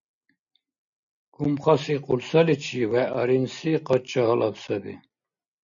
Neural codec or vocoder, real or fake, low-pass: none; real; 7.2 kHz